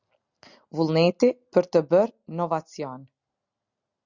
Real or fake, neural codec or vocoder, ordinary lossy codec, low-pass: real; none; Opus, 64 kbps; 7.2 kHz